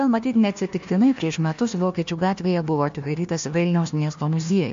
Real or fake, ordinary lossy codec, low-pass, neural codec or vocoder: fake; MP3, 48 kbps; 7.2 kHz; codec, 16 kHz, 1 kbps, FunCodec, trained on Chinese and English, 50 frames a second